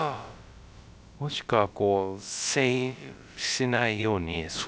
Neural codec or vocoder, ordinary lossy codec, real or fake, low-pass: codec, 16 kHz, about 1 kbps, DyCAST, with the encoder's durations; none; fake; none